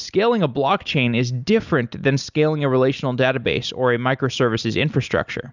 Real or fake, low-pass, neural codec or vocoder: real; 7.2 kHz; none